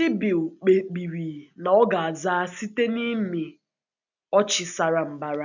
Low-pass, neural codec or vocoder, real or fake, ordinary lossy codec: 7.2 kHz; none; real; none